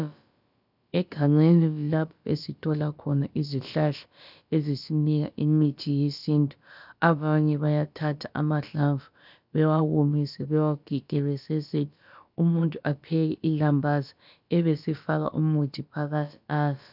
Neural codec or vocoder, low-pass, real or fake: codec, 16 kHz, about 1 kbps, DyCAST, with the encoder's durations; 5.4 kHz; fake